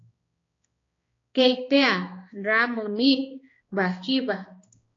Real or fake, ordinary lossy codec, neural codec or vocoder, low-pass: fake; AAC, 32 kbps; codec, 16 kHz, 2 kbps, X-Codec, HuBERT features, trained on balanced general audio; 7.2 kHz